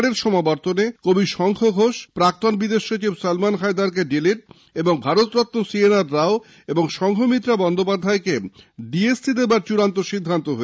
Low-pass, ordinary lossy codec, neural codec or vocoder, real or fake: none; none; none; real